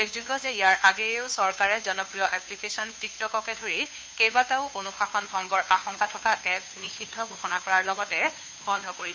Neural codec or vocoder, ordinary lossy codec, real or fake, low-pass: codec, 16 kHz, 2 kbps, FunCodec, trained on Chinese and English, 25 frames a second; none; fake; none